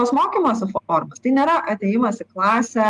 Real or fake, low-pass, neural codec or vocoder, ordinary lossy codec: real; 10.8 kHz; none; Opus, 32 kbps